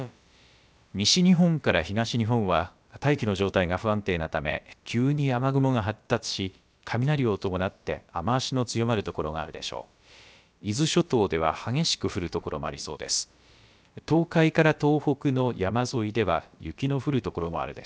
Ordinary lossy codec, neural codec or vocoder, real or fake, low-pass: none; codec, 16 kHz, about 1 kbps, DyCAST, with the encoder's durations; fake; none